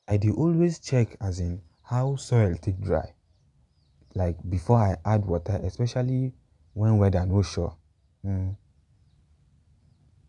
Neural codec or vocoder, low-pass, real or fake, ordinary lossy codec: none; 10.8 kHz; real; none